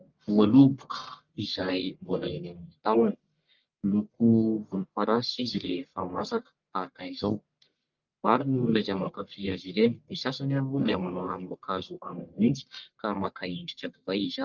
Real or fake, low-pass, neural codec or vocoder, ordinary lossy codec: fake; 7.2 kHz; codec, 44.1 kHz, 1.7 kbps, Pupu-Codec; Opus, 24 kbps